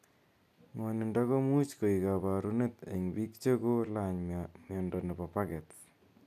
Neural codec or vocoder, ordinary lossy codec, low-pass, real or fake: none; none; 14.4 kHz; real